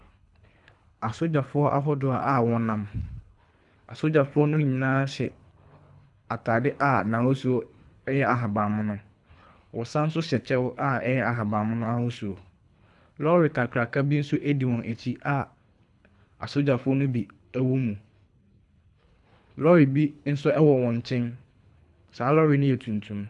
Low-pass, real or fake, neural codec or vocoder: 10.8 kHz; fake; codec, 24 kHz, 3 kbps, HILCodec